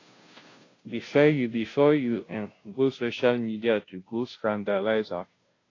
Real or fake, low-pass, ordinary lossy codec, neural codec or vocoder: fake; 7.2 kHz; AAC, 32 kbps; codec, 16 kHz, 0.5 kbps, FunCodec, trained on Chinese and English, 25 frames a second